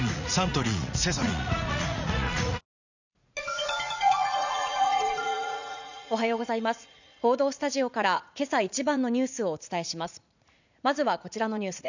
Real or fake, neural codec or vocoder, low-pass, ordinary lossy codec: fake; vocoder, 44.1 kHz, 80 mel bands, Vocos; 7.2 kHz; none